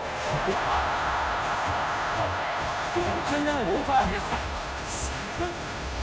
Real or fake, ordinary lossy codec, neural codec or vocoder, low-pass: fake; none; codec, 16 kHz, 0.5 kbps, FunCodec, trained on Chinese and English, 25 frames a second; none